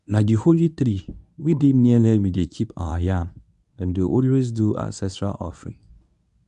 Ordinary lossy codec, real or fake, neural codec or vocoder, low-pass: none; fake; codec, 24 kHz, 0.9 kbps, WavTokenizer, medium speech release version 1; 10.8 kHz